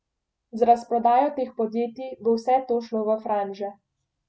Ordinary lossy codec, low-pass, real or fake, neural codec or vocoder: none; none; real; none